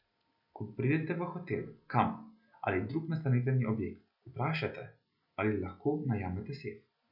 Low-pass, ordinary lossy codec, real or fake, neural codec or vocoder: 5.4 kHz; none; real; none